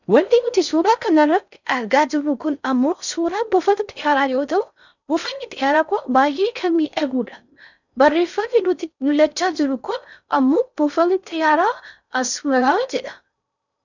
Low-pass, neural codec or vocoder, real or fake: 7.2 kHz; codec, 16 kHz in and 24 kHz out, 0.6 kbps, FocalCodec, streaming, 4096 codes; fake